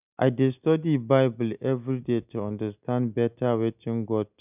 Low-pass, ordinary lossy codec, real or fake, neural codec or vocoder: 3.6 kHz; none; real; none